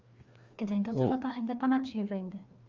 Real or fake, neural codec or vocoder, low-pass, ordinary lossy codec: fake; codec, 16 kHz, 2 kbps, FreqCodec, larger model; 7.2 kHz; Opus, 32 kbps